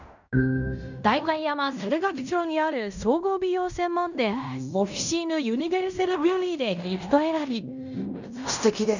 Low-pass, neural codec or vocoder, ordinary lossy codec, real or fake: 7.2 kHz; codec, 16 kHz in and 24 kHz out, 0.9 kbps, LongCat-Audio-Codec, fine tuned four codebook decoder; none; fake